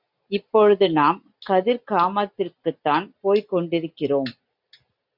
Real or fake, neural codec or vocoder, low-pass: real; none; 5.4 kHz